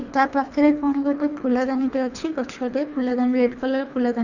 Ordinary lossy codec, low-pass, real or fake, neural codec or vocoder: none; 7.2 kHz; fake; codec, 24 kHz, 3 kbps, HILCodec